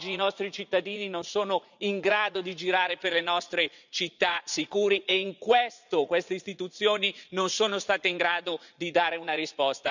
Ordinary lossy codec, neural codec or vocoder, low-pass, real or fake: none; vocoder, 44.1 kHz, 80 mel bands, Vocos; 7.2 kHz; fake